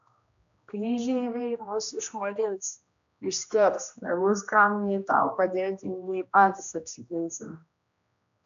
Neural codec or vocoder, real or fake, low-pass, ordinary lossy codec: codec, 16 kHz, 1 kbps, X-Codec, HuBERT features, trained on general audio; fake; 7.2 kHz; AAC, 64 kbps